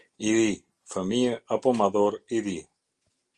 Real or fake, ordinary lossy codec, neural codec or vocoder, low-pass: real; Opus, 32 kbps; none; 10.8 kHz